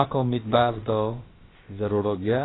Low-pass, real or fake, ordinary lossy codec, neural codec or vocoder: 7.2 kHz; fake; AAC, 16 kbps; codec, 16 kHz, about 1 kbps, DyCAST, with the encoder's durations